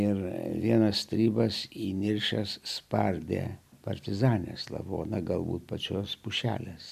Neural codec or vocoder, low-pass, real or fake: none; 14.4 kHz; real